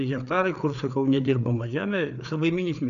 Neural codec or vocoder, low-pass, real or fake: codec, 16 kHz, 4 kbps, FreqCodec, larger model; 7.2 kHz; fake